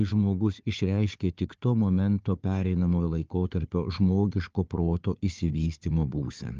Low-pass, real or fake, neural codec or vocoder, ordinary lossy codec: 7.2 kHz; fake; codec, 16 kHz, 4 kbps, FunCodec, trained on Chinese and English, 50 frames a second; Opus, 24 kbps